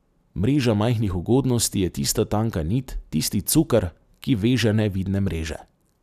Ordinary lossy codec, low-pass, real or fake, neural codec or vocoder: none; 14.4 kHz; real; none